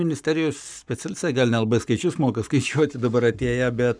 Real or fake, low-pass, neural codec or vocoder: fake; 9.9 kHz; codec, 44.1 kHz, 7.8 kbps, Pupu-Codec